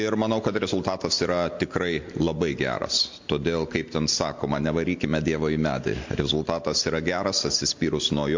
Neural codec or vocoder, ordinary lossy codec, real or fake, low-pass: none; MP3, 48 kbps; real; 7.2 kHz